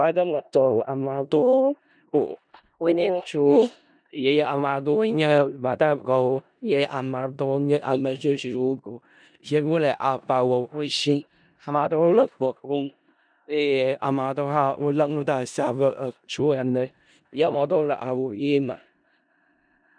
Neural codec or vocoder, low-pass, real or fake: codec, 16 kHz in and 24 kHz out, 0.4 kbps, LongCat-Audio-Codec, four codebook decoder; 9.9 kHz; fake